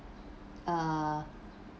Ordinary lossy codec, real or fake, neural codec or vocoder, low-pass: none; real; none; none